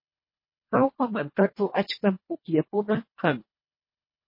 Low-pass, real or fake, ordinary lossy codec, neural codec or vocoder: 5.4 kHz; fake; MP3, 24 kbps; codec, 24 kHz, 1.5 kbps, HILCodec